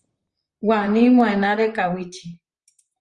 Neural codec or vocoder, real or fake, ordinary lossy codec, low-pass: vocoder, 44.1 kHz, 128 mel bands, Pupu-Vocoder; fake; Opus, 32 kbps; 10.8 kHz